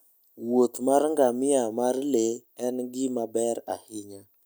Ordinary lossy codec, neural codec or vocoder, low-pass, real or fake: none; none; none; real